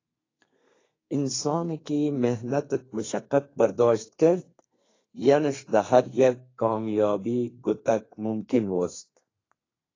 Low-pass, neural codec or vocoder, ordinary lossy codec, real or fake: 7.2 kHz; codec, 32 kHz, 1.9 kbps, SNAC; AAC, 32 kbps; fake